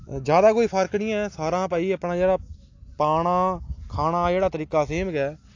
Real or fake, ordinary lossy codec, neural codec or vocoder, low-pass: real; AAC, 48 kbps; none; 7.2 kHz